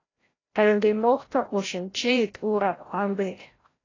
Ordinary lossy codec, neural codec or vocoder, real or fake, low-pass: AAC, 32 kbps; codec, 16 kHz, 0.5 kbps, FreqCodec, larger model; fake; 7.2 kHz